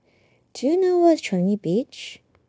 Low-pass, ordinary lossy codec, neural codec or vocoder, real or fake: none; none; codec, 16 kHz, 0.9 kbps, LongCat-Audio-Codec; fake